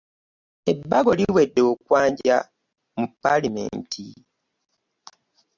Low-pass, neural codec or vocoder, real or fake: 7.2 kHz; none; real